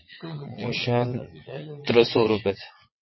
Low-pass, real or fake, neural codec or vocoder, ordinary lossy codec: 7.2 kHz; fake; vocoder, 22.05 kHz, 80 mel bands, WaveNeXt; MP3, 24 kbps